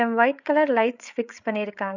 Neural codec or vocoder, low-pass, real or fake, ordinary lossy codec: codec, 16 kHz, 8 kbps, FreqCodec, larger model; 7.2 kHz; fake; none